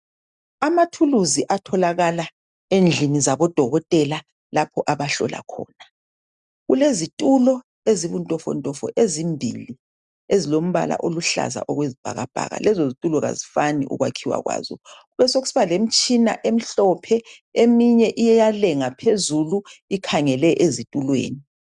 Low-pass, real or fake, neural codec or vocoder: 10.8 kHz; real; none